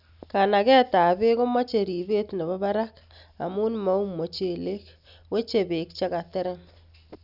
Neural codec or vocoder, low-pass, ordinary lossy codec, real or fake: none; 5.4 kHz; none; real